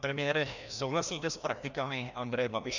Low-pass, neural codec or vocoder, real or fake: 7.2 kHz; codec, 16 kHz, 1 kbps, FreqCodec, larger model; fake